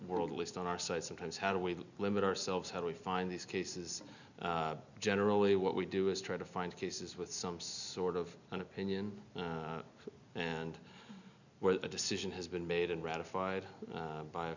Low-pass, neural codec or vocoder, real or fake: 7.2 kHz; none; real